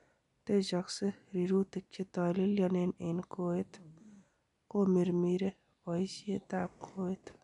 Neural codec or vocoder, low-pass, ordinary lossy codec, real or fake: none; 10.8 kHz; none; real